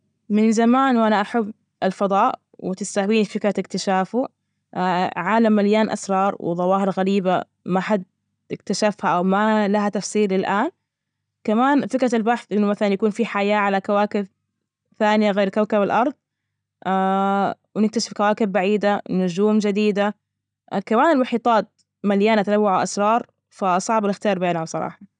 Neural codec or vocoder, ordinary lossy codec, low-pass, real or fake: none; none; 9.9 kHz; real